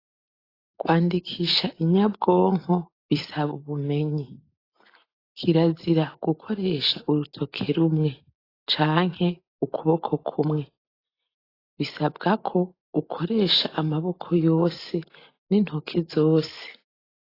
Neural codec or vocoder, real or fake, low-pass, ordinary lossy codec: none; real; 5.4 kHz; AAC, 24 kbps